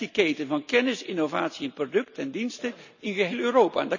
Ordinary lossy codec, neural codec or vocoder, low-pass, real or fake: none; none; 7.2 kHz; real